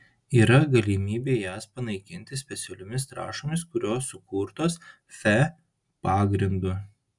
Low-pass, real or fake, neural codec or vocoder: 10.8 kHz; real; none